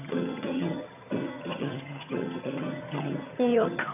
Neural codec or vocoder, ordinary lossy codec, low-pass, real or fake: vocoder, 22.05 kHz, 80 mel bands, HiFi-GAN; none; 3.6 kHz; fake